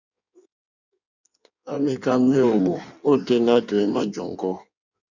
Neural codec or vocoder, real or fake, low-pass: codec, 16 kHz in and 24 kHz out, 1.1 kbps, FireRedTTS-2 codec; fake; 7.2 kHz